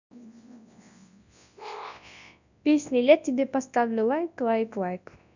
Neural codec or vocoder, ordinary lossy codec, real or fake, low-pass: codec, 24 kHz, 0.9 kbps, WavTokenizer, large speech release; none; fake; 7.2 kHz